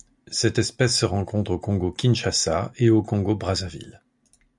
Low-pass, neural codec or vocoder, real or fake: 10.8 kHz; none; real